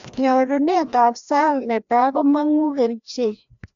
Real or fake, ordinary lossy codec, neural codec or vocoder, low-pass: fake; MP3, 64 kbps; codec, 16 kHz, 1 kbps, FreqCodec, larger model; 7.2 kHz